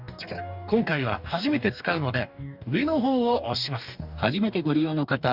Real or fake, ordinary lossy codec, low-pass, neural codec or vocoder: fake; none; 5.4 kHz; codec, 44.1 kHz, 2.6 kbps, DAC